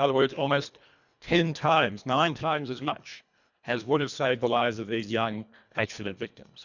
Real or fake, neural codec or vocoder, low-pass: fake; codec, 24 kHz, 1.5 kbps, HILCodec; 7.2 kHz